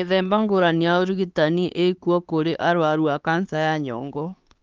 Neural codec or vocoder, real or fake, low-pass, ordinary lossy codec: codec, 16 kHz, 4 kbps, X-Codec, HuBERT features, trained on LibriSpeech; fake; 7.2 kHz; Opus, 32 kbps